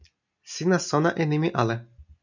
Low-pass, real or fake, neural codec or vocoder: 7.2 kHz; real; none